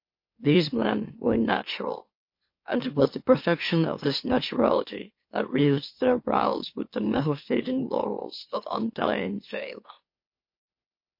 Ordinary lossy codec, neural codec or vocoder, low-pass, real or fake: MP3, 32 kbps; autoencoder, 44.1 kHz, a latent of 192 numbers a frame, MeloTTS; 5.4 kHz; fake